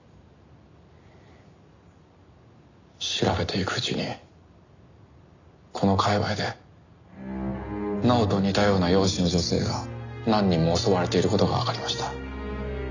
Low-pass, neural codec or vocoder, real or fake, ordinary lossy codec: 7.2 kHz; none; real; none